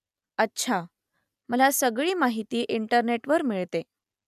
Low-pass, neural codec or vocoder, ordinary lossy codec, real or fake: 14.4 kHz; none; none; real